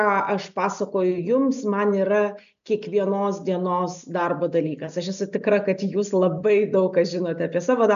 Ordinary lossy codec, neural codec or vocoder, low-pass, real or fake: MP3, 96 kbps; none; 7.2 kHz; real